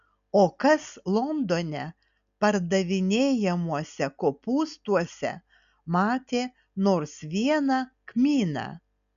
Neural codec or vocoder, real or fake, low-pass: none; real; 7.2 kHz